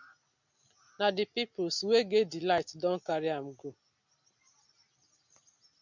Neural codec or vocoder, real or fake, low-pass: none; real; 7.2 kHz